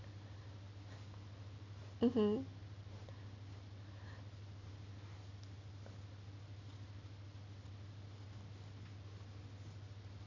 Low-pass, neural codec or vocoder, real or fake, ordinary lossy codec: 7.2 kHz; none; real; none